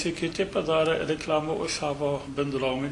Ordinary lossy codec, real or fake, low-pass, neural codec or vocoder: AAC, 48 kbps; real; 10.8 kHz; none